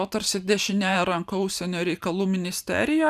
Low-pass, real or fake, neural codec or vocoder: 14.4 kHz; fake; vocoder, 44.1 kHz, 128 mel bands every 512 samples, BigVGAN v2